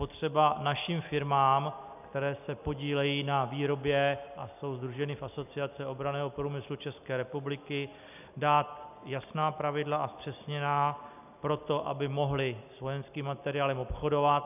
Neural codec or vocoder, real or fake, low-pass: none; real; 3.6 kHz